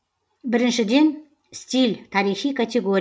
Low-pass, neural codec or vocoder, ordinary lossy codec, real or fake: none; none; none; real